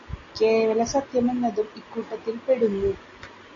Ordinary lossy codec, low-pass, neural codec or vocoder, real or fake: AAC, 32 kbps; 7.2 kHz; none; real